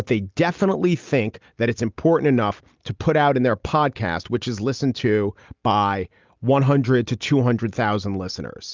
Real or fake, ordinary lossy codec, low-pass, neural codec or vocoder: real; Opus, 24 kbps; 7.2 kHz; none